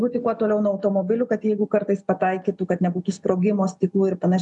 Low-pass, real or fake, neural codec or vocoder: 10.8 kHz; real; none